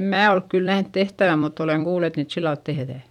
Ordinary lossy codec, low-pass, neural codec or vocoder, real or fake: none; 19.8 kHz; vocoder, 44.1 kHz, 128 mel bands every 256 samples, BigVGAN v2; fake